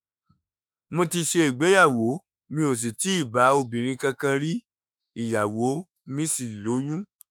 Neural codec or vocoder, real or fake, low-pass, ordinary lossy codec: autoencoder, 48 kHz, 32 numbers a frame, DAC-VAE, trained on Japanese speech; fake; none; none